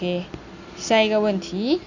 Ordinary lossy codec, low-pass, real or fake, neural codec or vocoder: Opus, 64 kbps; 7.2 kHz; real; none